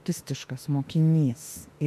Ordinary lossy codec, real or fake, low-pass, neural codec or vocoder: MP3, 64 kbps; fake; 14.4 kHz; autoencoder, 48 kHz, 32 numbers a frame, DAC-VAE, trained on Japanese speech